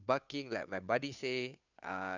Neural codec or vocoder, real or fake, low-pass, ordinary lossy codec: codec, 16 kHz, 2 kbps, FunCodec, trained on Chinese and English, 25 frames a second; fake; 7.2 kHz; none